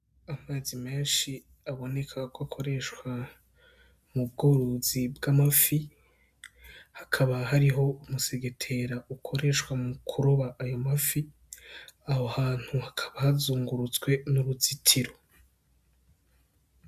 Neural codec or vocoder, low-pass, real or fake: none; 14.4 kHz; real